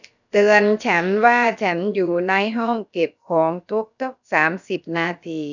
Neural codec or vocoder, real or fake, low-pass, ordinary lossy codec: codec, 16 kHz, about 1 kbps, DyCAST, with the encoder's durations; fake; 7.2 kHz; none